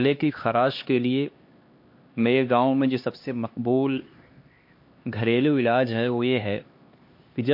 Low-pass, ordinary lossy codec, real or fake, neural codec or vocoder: 5.4 kHz; MP3, 32 kbps; fake; codec, 16 kHz, 2 kbps, X-Codec, HuBERT features, trained on LibriSpeech